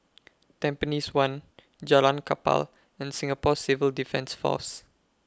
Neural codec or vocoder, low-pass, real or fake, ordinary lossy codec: none; none; real; none